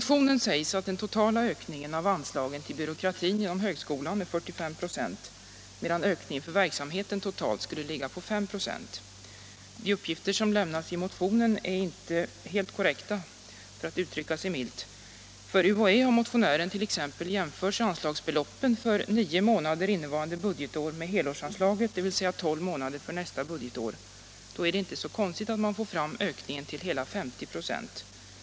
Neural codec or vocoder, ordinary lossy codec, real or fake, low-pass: none; none; real; none